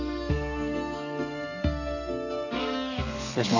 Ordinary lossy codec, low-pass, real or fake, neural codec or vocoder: none; 7.2 kHz; fake; codec, 32 kHz, 1.9 kbps, SNAC